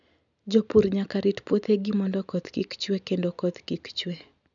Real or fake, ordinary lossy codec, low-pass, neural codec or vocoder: real; none; 7.2 kHz; none